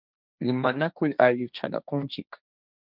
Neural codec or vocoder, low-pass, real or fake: codec, 16 kHz, 1.1 kbps, Voila-Tokenizer; 5.4 kHz; fake